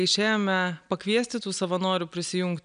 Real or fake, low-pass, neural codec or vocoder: real; 9.9 kHz; none